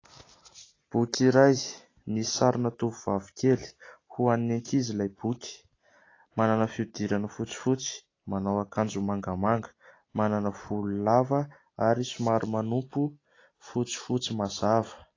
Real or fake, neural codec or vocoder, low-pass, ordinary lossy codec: real; none; 7.2 kHz; AAC, 32 kbps